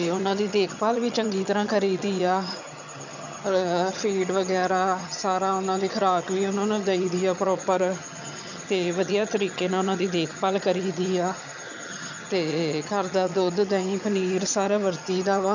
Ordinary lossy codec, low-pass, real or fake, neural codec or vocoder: none; 7.2 kHz; fake; vocoder, 22.05 kHz, 80 mel bands, HiFi-GAN